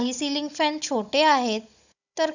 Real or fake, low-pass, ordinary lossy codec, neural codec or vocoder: fake; 7.2 kHz; none; codec, 16 kHz, 16 kbps, FunCodec, trained on Chinese and English, 50 frames a second